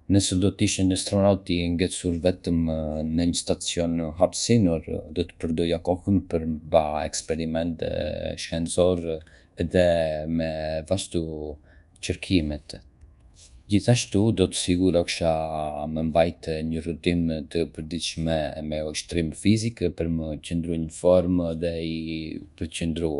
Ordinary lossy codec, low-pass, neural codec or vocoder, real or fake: none; 10.8 kHz; codec, 24 kHz, 1.2 kbps, DualCodec; fake